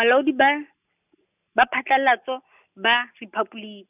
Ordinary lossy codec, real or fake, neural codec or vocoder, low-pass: none; real; none; 3.6 kHz